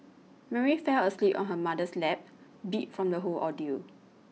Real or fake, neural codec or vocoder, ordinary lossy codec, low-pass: real; none; none; none